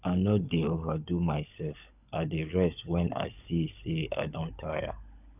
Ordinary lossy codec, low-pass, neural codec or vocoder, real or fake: none; 3.6 kHz; codec, 16 kHz, 16 kbps, FunCodec, trained on LibriTTS, 50 frames a second; fake